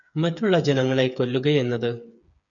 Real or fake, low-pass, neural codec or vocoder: fake; 7.2 kHz; codec, 16 kHz, 8 kbps, FreqCodec, smaller model